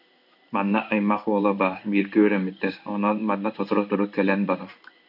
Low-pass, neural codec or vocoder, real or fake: 5.4 kHz; codec, 16 kHz in and 24 kHz out, 1 kbps, XY-Tokenizer; fake